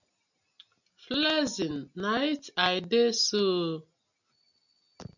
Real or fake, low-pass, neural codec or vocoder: real; 7.2 kHz; none